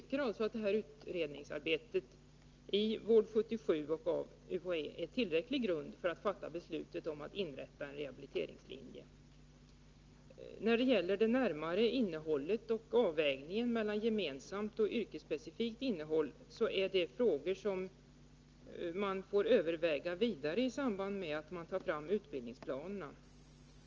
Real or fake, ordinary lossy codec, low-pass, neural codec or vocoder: real; Opus, 24 kbps; 7.2 kHz; none